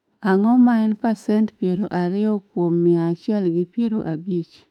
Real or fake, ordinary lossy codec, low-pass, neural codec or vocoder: fake; none; 19.8 kHz; autoencoder, 48 kHz, 32 numbers a frame, DAC-VAE, trained on Japanese speech